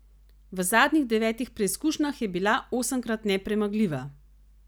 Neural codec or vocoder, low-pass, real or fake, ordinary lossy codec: none; none; real; none